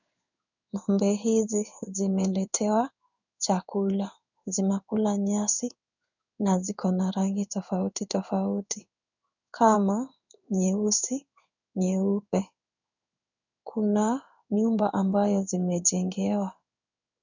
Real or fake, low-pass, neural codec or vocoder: fake; 7.2 kHz; codec, 16 kHz in and 24 kHz out, 1 kbps, XY-Tokenizer